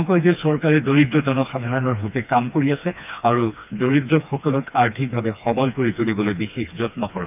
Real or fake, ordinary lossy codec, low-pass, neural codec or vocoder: fake; none; 3.6 kHz; codec, 16 kHz, 2 kbps, FreqCodec, smaller model